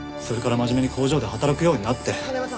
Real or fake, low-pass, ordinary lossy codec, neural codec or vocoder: real; none; none; none